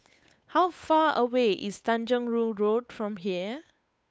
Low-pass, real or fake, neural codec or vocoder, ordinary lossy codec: none; fake; codec, 16 kHz, 8 kbps, FunCodec, trained on Chinese and English, 25 frames a second; none